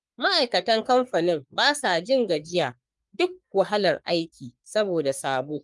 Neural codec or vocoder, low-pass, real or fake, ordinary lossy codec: codec, 44.1 kHz, 3.4 kbps, Pupu-Codec; 10.8 kHz; fake; Opus, 32 kbps